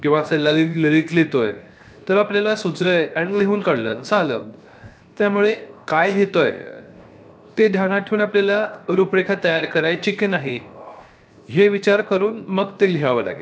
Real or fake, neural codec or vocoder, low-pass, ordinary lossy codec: fake; codec, 16 kHz, 0.7 kbps, FocalCodec; none; none